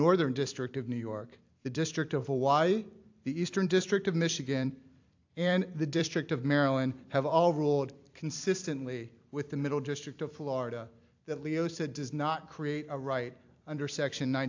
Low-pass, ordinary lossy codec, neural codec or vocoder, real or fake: 7.2 kHz; AAC, 48 kbps; vocoder, 44.1 kHz, 128 mel bands every 256 samples, BigVGAN v2; fake